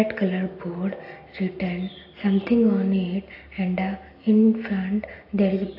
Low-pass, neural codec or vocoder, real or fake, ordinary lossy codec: 5.4 kHz; none; real; AAC, 32 kbps